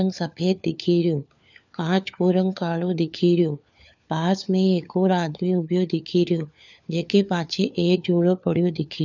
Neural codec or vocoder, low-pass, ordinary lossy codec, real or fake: codec, 16 kHz, 4 kbps, FunCodec, trained on LibriTTS, 50 frames a second; 7.2 kHz; none; fake